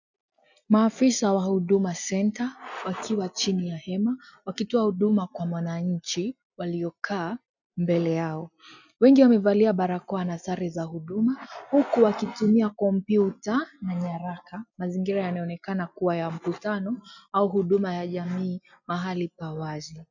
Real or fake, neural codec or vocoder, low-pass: real; none; 7.2 kHz